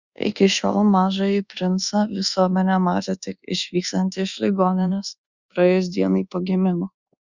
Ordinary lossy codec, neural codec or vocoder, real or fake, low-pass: Opus, 64 kbps; codec, 24 kHz, 1.2 kbps, DualCodec; fake; 7.2 kHz